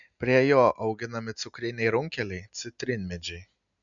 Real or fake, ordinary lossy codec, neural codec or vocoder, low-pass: real; MP3, 96 kbps; none; 7.2 kHz